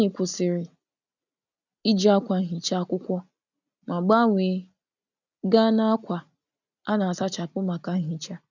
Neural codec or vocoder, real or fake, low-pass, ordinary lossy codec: none; real; 7.2 kHz; none